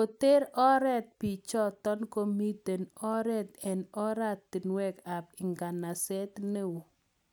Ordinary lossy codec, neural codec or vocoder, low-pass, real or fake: none; none; none; real